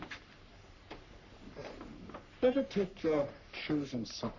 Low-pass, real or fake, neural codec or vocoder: 7.2 kHz; fake; codec, 44.1 kHz, 3.4 kbps, Pupu-Codec